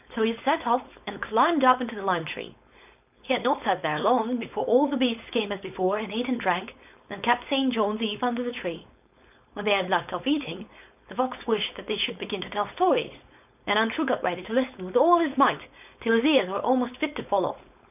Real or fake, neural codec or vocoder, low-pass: fake; codec, 16 kHz, 4.8 kbps, FACodec; 3.6 kHz